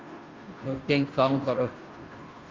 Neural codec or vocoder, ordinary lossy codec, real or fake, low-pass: codec, 16 kHz, 0.5 kbps, FunCodec, trained on Chinese and English, 25 frames a second; Opus, 32 kbps; fake; 7.2 kHz